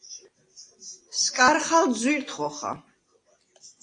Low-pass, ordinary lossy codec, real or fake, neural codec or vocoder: 9.9 kHz; AAC, 32 kbps; real; none